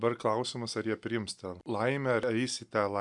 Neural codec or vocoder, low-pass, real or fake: none; 10.8 kHz; real